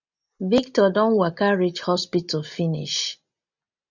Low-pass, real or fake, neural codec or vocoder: 7.2 kHz; real; none